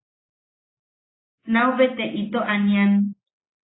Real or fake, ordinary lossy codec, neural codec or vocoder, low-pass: real; AAC, 16 kbps; none; 7.2 kHz